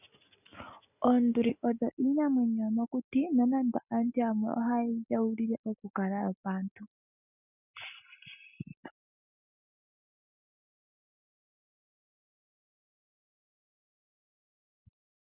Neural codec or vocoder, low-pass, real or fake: none; 3.6 kHz; real